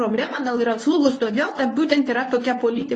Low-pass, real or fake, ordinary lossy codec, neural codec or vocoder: 10.8 kHz; fake; AAC, 32 kbps; codec, 24 kHz, 0.9 kbps, WavTokenizer, medium speech release version 1